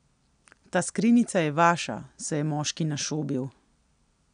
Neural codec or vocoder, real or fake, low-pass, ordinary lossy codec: none; real; 9.9 kHz; none